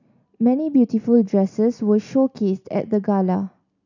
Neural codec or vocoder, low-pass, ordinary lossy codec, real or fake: none; 7.2 kHz; none; real